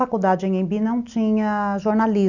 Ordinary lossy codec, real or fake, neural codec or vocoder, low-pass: none; real; none; 7.2 kHz